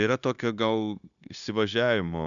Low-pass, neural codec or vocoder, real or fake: 7.2 kHz; codec, 16 kHz, 0.9 kbps, LongCat-Audio-Codec; fake